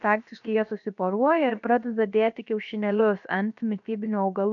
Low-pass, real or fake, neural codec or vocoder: 7.2 kHz; fake; codec, 16 kHz, about 1 kbps, DyCAST, with the encoder's durations